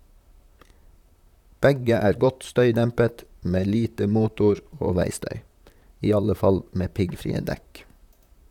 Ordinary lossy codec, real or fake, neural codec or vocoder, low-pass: none; fake; vocoder, 44.1 kHz, 128 mel bands, Pupu-Vocoder; 19.8 kHz